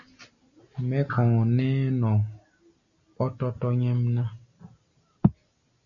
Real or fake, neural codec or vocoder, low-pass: real; none; 7.2 kHz